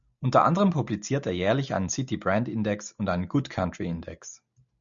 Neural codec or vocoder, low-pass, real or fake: none; 7.2 kHz; real